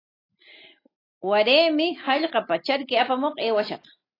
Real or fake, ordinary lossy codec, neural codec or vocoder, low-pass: real; AAC, 24 kbps; none; 5.4 kHz